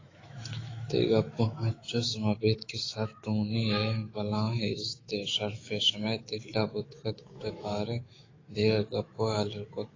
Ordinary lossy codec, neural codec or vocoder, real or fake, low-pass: AAC, 32 kbps; autoencoder, 48 kHz, 128 numbers a frame, DAC-VAE, trained on Japanese speech; fake; 7.2 kHz